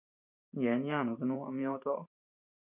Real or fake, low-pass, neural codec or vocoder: fake; 3.6 kHz; vocoder, 44.1 kHz, 128 mel bands every 512 samples, BigVGAN v2